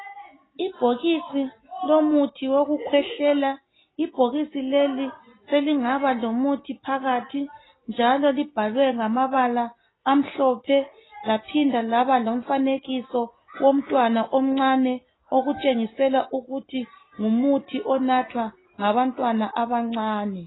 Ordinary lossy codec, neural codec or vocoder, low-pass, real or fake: AAC, 16 kbps; none; 7.2 kHz; real